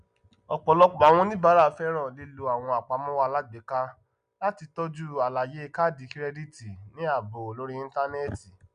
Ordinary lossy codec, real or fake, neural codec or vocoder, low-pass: none; real; none; 9.9 kHz